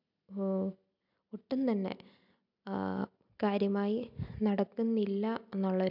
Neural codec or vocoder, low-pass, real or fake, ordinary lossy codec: none; 5.4 kHz; real; none